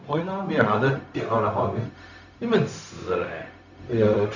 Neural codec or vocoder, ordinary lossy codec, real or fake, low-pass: codec, 16 kHz, 0.4 kbps, LongCat-Audio-Codec; none; fake; 7.2 kHz